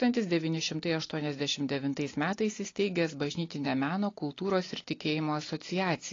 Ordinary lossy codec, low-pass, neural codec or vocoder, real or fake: AAC, 32 kbps; 7.2 kHz; none; real